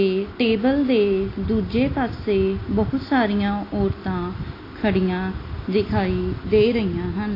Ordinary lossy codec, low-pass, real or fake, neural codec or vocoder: AAC, 24 kbps; 5.4 kHz; real; none